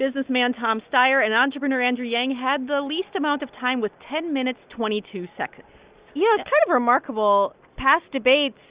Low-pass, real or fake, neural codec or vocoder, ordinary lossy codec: 3.6 kHz; real; none; Opus, 64 kbps